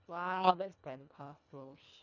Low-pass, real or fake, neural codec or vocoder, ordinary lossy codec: 7.2 kHz; fake; codec, 24 kHz, 1.5 kbps, HILCodec; none